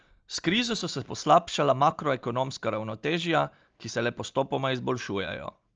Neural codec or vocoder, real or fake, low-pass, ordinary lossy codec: none; real; 7.2 kHz; Opus, 24 kbps